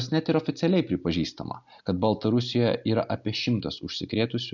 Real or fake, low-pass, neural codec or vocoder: real; 7.2 kHz; none